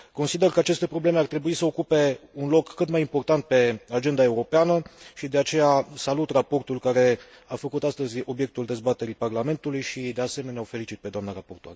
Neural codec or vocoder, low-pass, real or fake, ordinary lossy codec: none; none; real; none